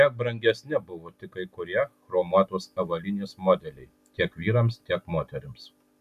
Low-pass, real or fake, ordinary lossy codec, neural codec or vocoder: 14.4 kHz; real; MP3, 96 kbps; none